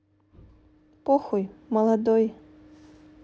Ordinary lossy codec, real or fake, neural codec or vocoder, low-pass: none; real; none; none